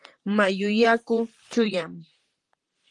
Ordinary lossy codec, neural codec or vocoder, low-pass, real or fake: Opus, 24 kbps; vocoder, 44.1 kHz, 128 mel bands, Pupu-Vocoder; 10.8 kHz; fake